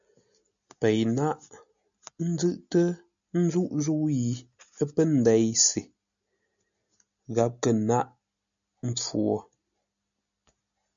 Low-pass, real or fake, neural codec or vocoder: 7.2 kHz; real; none